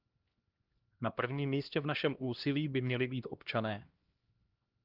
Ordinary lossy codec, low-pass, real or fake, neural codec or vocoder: Opus, 32 kbps; 5.4 kHz; fake; codec, 16 kHz, 1 kbps, X-Codec, HuBERT features, trained on LibriSpeech